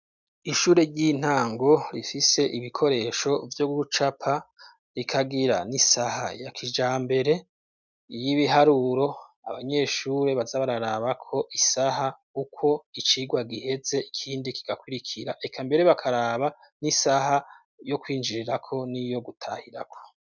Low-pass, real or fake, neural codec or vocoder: 7.2 kHz; real; none